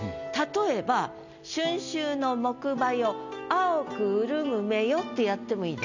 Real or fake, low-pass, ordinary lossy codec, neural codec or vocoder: real; 7.2 kHz; none; none